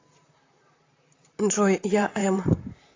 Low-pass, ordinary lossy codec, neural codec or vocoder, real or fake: 7.2 kHz; AAC, 32 kbps; vocoder, 44.1 kHz, 128 mel bands, Pupu-Vocoder; fake